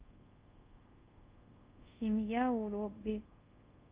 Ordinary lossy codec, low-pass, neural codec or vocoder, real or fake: Opus, 32 kbps; 3.6 kHz; codec, 24 kHz, 0.5 kbps, DualCodec; fake